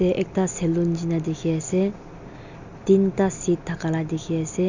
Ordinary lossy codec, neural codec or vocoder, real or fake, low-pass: none; none; real; 7.2 kHz